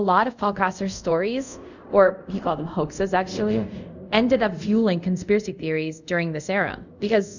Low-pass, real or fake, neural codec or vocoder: 7.2 kHz; fake; codec, 24 kHz, 0.5 kbps, DualCodec